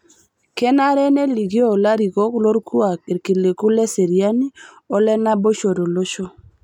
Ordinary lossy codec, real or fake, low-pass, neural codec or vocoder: none; real; 19.8 kHz; none